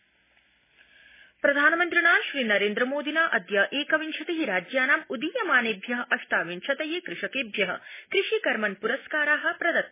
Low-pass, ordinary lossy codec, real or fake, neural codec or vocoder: 3.6 kHz; MP3, 16 kbps; real; none